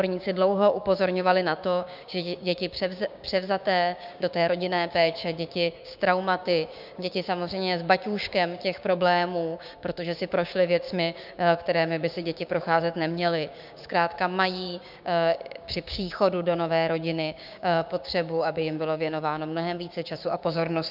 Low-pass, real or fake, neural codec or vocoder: 5.4 kHz; fake; codec, 16 kHz, 6 kbps, DAC